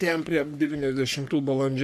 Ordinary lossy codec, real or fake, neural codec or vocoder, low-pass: AAC, 96 kbps; fake; codec, 44.1 kHz, 3.4 kbps, Pupu-Codec; 14.4 kHz